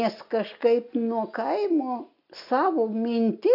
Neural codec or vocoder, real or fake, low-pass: none; real; 5.4 kHz